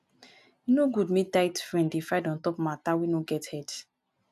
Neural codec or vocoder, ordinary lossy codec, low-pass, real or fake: none; none; 14.4 kHz; real